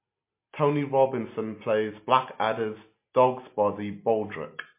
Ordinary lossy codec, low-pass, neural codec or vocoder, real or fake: MP3, 24 kbps; 3.6 kHz; none; real